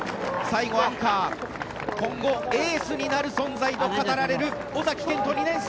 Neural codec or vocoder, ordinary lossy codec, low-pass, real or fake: none; none; none; real